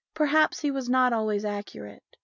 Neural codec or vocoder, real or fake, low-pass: none; real; 7.2 kHz